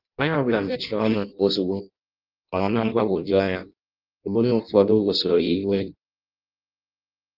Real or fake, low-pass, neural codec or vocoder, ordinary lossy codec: fake; 5.4 kHz; codec, 16 kHz in and 24 kHz out, 0.6 kbps, FireRedTTS-2 codec; Opus, 24 kbps